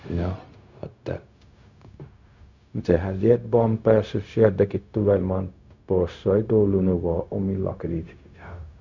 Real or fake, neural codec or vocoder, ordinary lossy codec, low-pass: fake; codec, 16 kHz, 0.4 kbps, LongCat-Audio-Codec; none; 7.2 kHz